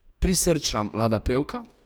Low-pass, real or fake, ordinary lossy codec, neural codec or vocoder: none; fake; none; codec, 44.1 kHz, 2.6 kbps, DAC